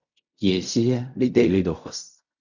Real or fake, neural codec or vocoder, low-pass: fake; codec, 16 kHz in and 24 kHz out, 0.4 kbps, LongCat-Audio-Codec, fine tuned four codebook decoder; 7.2 kHz